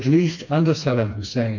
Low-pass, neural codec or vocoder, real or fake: 7.2 kHz; codec, 16 kHz, 2 kbps, FreqCodec, smaller model; fake